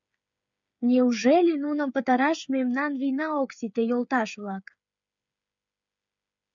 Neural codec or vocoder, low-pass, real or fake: codec, 16 kHz, 8 kbps, FreqCodec, smaller model; 7.2 kHz; fake